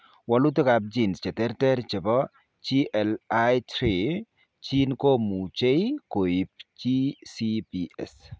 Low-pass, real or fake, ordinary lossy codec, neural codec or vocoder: none; real; none; none